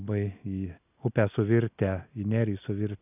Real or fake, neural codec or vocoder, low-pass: real; none; 3.6 kHz